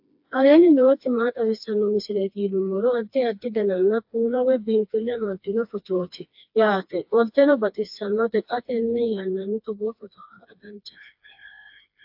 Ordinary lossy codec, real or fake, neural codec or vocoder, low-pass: AAC, 48 kbps; fake; codec, 16 kHz, 2 kbps, FreqCodec, smaller model; 5.4 kHz